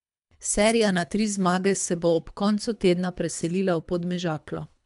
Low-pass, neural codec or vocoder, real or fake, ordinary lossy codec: 10.8 kHz; codec, 24 kHz, 3 kbps, HILCodec; fake; MP3, 96 kbps